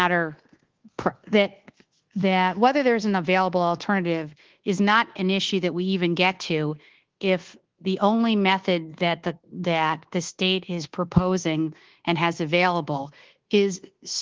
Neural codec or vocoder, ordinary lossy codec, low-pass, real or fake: codec, 24 kHz, 1.2 kbps, DualCodec; Opus, 16 kbps; 7.2 kHz; fake